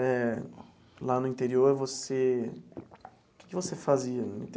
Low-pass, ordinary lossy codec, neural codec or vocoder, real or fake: none; none; none; real